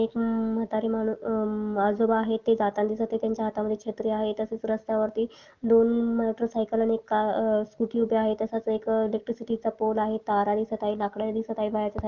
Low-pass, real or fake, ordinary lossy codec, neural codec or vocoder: 7.2 kHz; real; Opus, 24 kbps; none